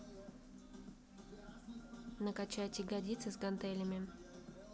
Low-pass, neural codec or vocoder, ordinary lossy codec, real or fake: none; none; none; real